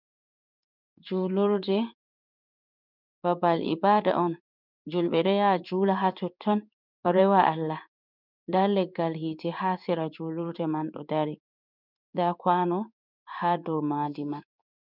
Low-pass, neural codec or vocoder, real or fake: 5.4 kHz; codec, 16 kHz in and 24 kHz out, 1 kbps, XY-Tokenizer; fake